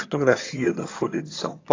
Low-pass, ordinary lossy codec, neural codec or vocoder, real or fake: 7.2 kHz; AAC, 32 kbps; vocoder, 22.05 kHz, 80 mel bands, HiFi-GAN; fake